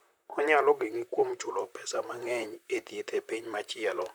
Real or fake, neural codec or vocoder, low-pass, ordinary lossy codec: fake; vocoder, 44.1 kHz, 128 mel bands, Pupu-Vocoder; none; none